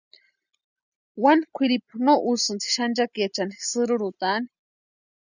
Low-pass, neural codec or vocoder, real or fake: 7.2 kHz; none; real